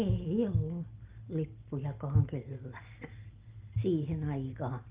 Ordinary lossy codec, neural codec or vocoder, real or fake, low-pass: Opus, 32 kbps; none; real; 3.6 kHz